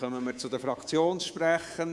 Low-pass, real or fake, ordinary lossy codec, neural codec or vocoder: none; fake; none; codec, 24 kHz, 3.1 kbps, DualCodec